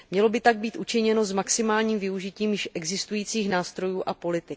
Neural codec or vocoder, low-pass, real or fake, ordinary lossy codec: none; none; real; none